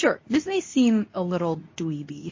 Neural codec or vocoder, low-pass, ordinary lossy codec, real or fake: codec, 24 kHz, 0.9 kbps, WavTokenizer, medium speech release version 2; 7.2 kHz; MP3, 32 kbps; fake